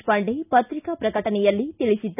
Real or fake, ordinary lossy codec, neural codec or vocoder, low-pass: real; none; none; 3.6 kHz